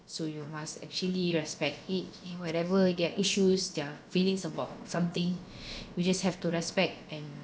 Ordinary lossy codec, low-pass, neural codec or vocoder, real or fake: none; none; codec, 16 kHz, about 1 kbps, DyCAST, with the encoder's durations; fake